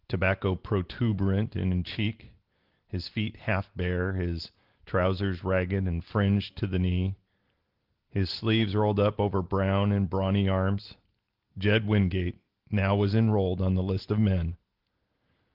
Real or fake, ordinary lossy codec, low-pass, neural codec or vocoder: real; Opus, 16 kbps; 5.4 kHz; none